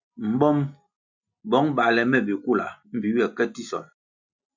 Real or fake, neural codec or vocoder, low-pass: real; none; 7.2 kHz